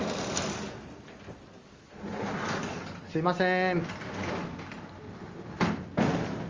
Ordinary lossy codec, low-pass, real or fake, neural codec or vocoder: Opus, 32 kbps; 7.2 kHz; real; none